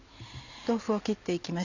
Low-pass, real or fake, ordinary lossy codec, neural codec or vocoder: 7.2 kHz; real; none; none